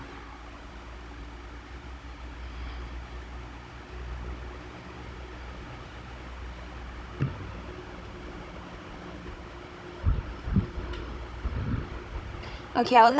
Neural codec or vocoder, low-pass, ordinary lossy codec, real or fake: codec, 16 kHz, 16 kbps, FunCodec, trained on Chinese and English, 50 frames a second; none; none; fake